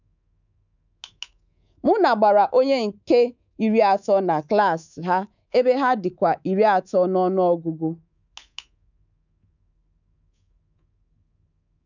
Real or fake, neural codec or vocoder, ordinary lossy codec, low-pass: fake; codec, 24 kHz, 3.1 kbps, DualCodec; none; 7.2 kHz